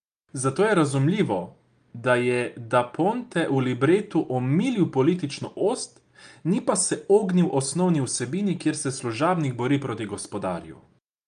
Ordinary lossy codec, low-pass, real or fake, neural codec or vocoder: Opus, 32 kbps; 9.9 kHz; real; none